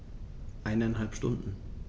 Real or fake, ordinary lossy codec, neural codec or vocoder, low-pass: real; none; none; none